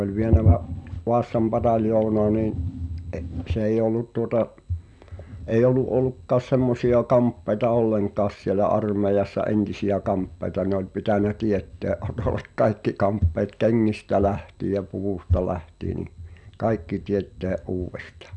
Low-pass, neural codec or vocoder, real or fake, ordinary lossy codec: 10.8 kHz; none; real; none